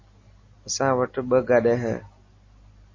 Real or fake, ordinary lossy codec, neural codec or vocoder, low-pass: real; MP3, 32 kbps; none; 7.2 kHz